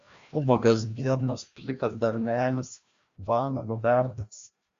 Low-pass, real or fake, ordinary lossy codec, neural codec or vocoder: 7.2 kHz; fake; Opus, 64 kbps; codec, 16 kHz, 1 kbps, FreqCodec, larger model